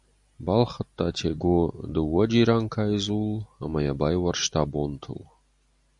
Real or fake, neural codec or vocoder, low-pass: real; none; 10.8 kHz